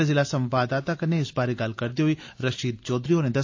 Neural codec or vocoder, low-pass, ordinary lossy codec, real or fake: none; 7.2 kHz; AAC, 48 kbps; real